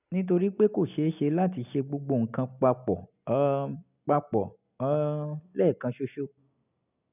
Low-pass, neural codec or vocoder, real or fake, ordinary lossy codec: 3.6 kHz; none; real; none